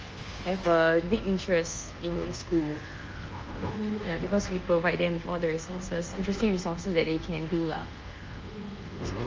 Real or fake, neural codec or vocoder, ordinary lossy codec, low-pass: fake; codec, 24 kHz, 1.2 kbps, DualCodec; Opus, 24 kbps; 7.2 kHz